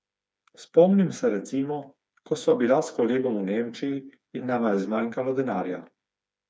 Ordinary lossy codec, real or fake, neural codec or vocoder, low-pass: none; fake; codec, 16 kHz, 4 kbps, FreqCodec, smaller model; none